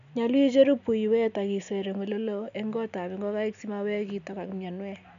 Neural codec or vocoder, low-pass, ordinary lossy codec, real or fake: none; 7.2 kHz; none; real